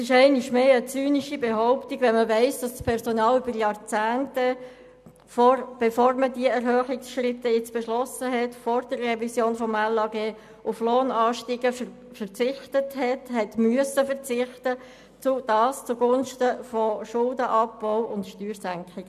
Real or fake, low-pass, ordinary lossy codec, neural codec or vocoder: real; 14.4 kHz; none; none